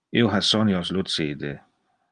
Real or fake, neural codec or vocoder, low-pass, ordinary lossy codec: real; none; 9.9 kHz; Opus, 32 kbps